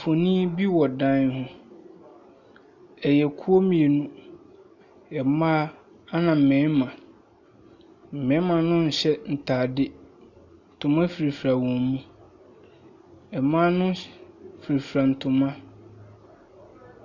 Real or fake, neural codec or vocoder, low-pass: real; none; 7.2 kHz